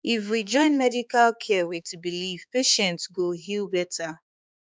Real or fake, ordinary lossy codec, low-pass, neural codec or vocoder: fake; none; none; codec, 16 kHz, 4 kbps, X-Codec, HuBERT features, trained on balanced general audio